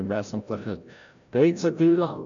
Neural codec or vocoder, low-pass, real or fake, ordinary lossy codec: codec, 16 kHz, 0.5 kbps, FreqCodec, larger model; 7.2 kHz; fake; none